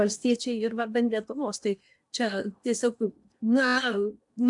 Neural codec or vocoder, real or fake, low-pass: codec, 16 kHz in and 24 kHz out, 0.8 kbps, FocalCodec, streaming, 65536 codes; fake; 10.8 kHz